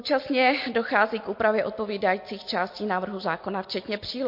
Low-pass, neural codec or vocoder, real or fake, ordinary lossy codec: 5.4 kHz; none; real; MP3, 32 kbps